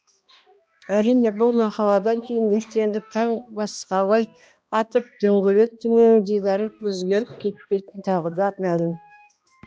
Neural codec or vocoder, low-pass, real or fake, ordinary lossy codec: codec, 16 kHz, 1 kbps, X-Codec, HuBERT features, trained on balanced general audio; none; fake; none